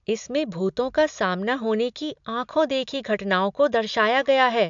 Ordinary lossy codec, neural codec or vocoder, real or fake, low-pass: MP3, 64 kbps; none; real; 7.2 kHz